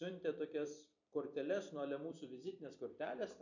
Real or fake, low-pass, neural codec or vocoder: fake; 7.2 kHz; vocoder, 44.1 kHz, 128 mel bands every 256 samples, BigVGAN v2